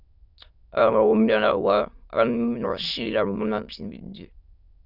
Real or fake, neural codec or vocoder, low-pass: fake; autoencoder, 22.05 kHz, a latent of 192 numbers a frame, VITS, trained on many speakers; 5.4 kHz